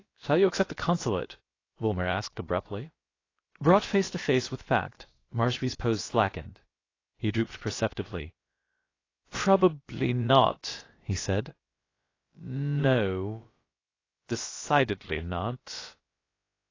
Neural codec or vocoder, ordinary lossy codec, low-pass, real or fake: codec, 16 kHz, about 1 kbps, DyCAST, with the encoder's durations; AAC, 32 kbps; 7.2 kHz; fake